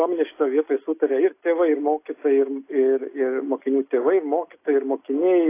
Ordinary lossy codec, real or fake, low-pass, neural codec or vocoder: AAC, 24 kbps; real; 3.6 kHz; none